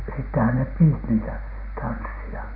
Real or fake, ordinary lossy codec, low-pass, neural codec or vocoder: real; none; 5.4 kHz; none